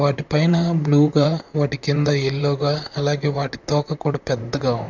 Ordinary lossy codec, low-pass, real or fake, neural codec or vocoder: none; 7.2 kHz; fake; vocoder, 44.1 kHz, 128 mel bands, Pupu-Vocoder